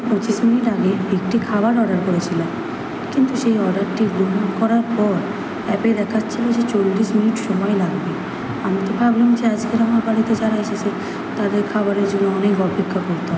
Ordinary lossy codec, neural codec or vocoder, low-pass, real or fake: none; none; none; real